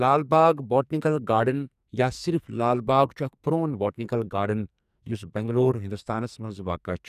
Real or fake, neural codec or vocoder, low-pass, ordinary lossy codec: fake; codec, 44.1 kHz, 2.6 kbps, SNAC; 14.4 kHz; none